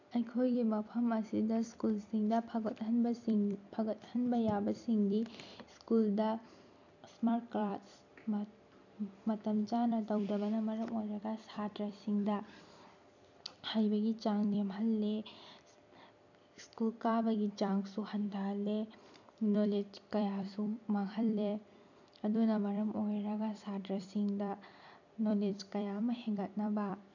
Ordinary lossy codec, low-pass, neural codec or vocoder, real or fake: none; 7.2 kHz; vocoder, 44.1 kHz, 128 mel bands every 256 samples, BigVGAN v2; fake